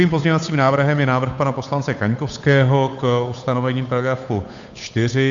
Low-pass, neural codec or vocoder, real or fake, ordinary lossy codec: 7.2 kHz; codec, 16 kHz, 8 kbps, FunCodec, trained on Chinese and English, 25 frames a second; fake; AAC, 64 kbps